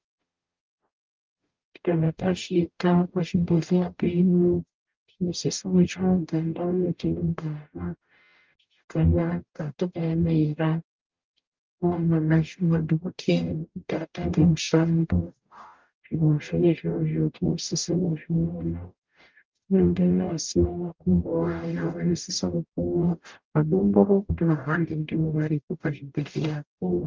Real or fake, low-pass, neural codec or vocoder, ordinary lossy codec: fake; 7.2 kHz; codec, 44.1 kHz, 0.9 kbps, DAC; Opus, 32 kbps